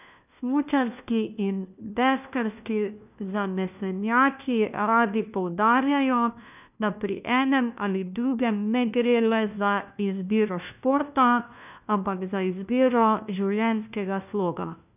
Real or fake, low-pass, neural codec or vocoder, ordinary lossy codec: fake; 3.6 kHz; codec, 16 kHz, 1 kbps, FunCodec, trained on LibriTTS, 50 frames a second; none